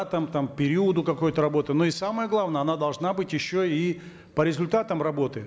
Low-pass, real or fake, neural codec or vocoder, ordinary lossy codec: none; real; none; none